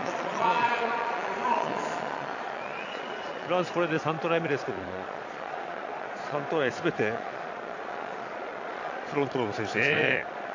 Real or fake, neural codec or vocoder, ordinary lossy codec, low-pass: fake; vocoder, 22.05 kHz, 80 mel bands, Vocos; AAC, 48 kbps; 7.2 kHz